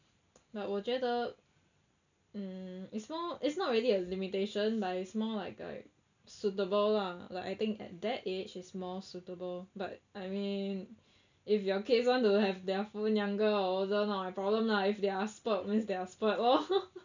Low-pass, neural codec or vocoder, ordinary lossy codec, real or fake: 7.2 kHz; none; none; real